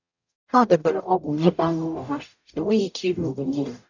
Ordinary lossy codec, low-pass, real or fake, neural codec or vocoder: none; 7.2 kHz; fake; codec, 44.1 kHz, 0.9 kbps, DAC